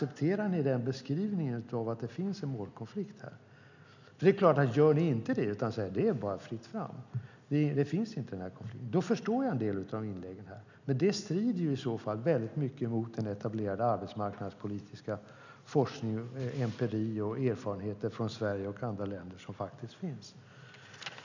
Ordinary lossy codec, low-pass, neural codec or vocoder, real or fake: none; 7.2 kHz; none; real